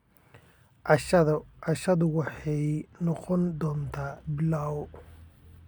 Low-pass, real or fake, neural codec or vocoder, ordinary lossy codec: none; real; none; none